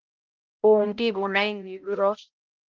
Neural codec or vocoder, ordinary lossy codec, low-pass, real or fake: codec, 16 kHz, 0.5 kbps, X-Codec, HuBERT features, trained on balanced general audio; Opus, 24 kbps; 7.2 kHz; fake